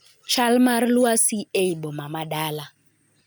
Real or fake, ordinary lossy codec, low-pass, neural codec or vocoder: real; none; none; none